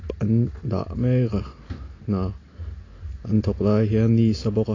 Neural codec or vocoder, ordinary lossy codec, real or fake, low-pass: none; AAC, 32 kbps; real; 7.2 kHz